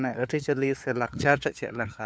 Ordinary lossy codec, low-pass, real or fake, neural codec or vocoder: none; none; fake; codec, 16 kHz, 4 kbps, FunCodec, trained on LibriTTS, 50 frames a second